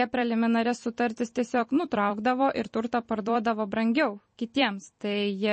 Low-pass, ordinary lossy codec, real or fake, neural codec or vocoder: 10.8 kHz; MP3, 32 kbps; fake; vocoder, 44.1 kHz, 128 mel bands every 256 samples, BigVGAN v2